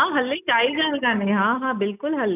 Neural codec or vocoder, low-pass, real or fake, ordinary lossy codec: none; 3.6 kHz; real; none